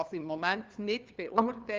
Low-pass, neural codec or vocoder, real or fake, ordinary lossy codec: 7.2 kHz; codec, 16 kHz, 2 kbps, FunCodec, trained on LibriTTS, 25 frames a second; fake; Opus, 24 kbps